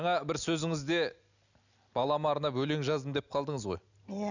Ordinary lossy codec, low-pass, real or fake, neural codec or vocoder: none; 7.2 kHz; real; none